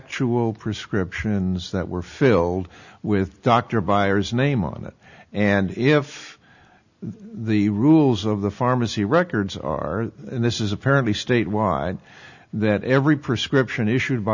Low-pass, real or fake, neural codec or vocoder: 7.2 kHz; real; none